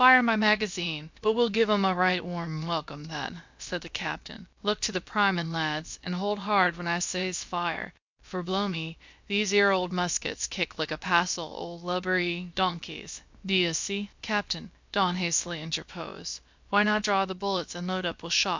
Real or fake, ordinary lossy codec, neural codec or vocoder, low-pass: fake; MP3, 64 kbps; codec, 16 kHz, about 1 kbps, DyCAST, with the encoder's durations; 7.2 kHz